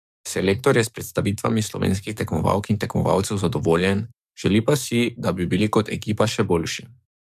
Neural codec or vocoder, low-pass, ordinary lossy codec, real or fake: codec, 44.1 kHz, 7.8 kbps, DAC; 14.4 kHz; MP3, 96 kbps; fake